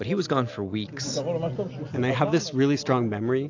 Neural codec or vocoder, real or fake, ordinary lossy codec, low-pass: none; real; AAC, 48 kbps; 7.2 kHz